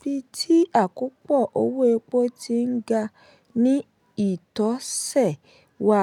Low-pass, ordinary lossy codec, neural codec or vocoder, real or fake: 19.8 kHz; none; none; real